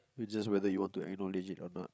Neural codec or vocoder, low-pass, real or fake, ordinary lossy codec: codec, 16 kHz, 16 kbps, FreqCodec, larger model; none; fake; none